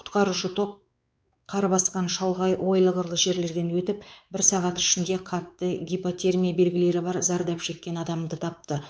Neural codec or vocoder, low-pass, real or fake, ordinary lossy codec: codec, 16 kHz, 4 kbps, X-Codec, WavLM features, trained on Multilingual LibriSpeech; none; fake; none